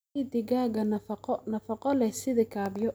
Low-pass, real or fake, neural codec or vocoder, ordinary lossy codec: none; real; none; none